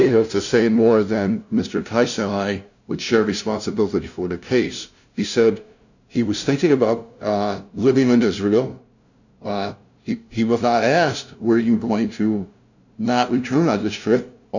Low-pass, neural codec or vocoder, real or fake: 7.2 kHz; codec, 16 kHz, 0.5 kbps, FunCodec, trained on LibriTTS, 25 frames a second; fake